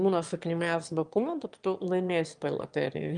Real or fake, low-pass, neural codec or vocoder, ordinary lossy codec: fake; 9.9 kHz; autoencoder, 22.05 kHz, a latent of 192 numbers a frame, VITS, trained on one speaker; Opus, 32 kbps